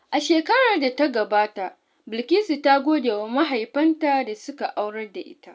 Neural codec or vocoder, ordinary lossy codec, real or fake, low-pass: none; none; real; none